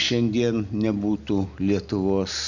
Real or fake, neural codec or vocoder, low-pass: real; none; 7.2 kHz